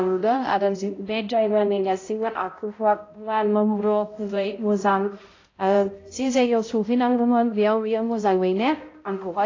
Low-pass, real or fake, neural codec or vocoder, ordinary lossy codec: 7.2 kHz; fake; codec, 16 kHz, 0.5 kbps, X-Codec, HuBERT features, trained on balanced general audio; AAC, 32 kbps